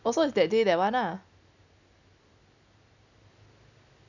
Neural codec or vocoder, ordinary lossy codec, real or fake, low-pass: none; none; real; 7.2 kHz